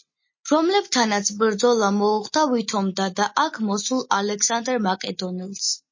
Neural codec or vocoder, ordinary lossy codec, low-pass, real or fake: none; MP3, 32 kbps; 7.2 kHz; real